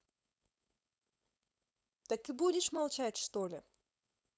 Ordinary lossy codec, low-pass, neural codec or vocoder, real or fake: none; none; codec, 16 kHz, 4.8 kbps, FACodec; fake